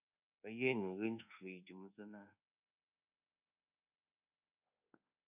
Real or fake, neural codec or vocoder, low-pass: fake; codec, 24 kHz, 1.2 kbps, DualCodec; 3.6 kHz